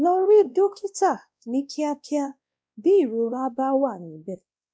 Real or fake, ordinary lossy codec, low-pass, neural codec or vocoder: fake; none; none; codec, 16 kHz, 1 kbps, X-Codec, WavLM features, trained on Multilingual LibriSpeech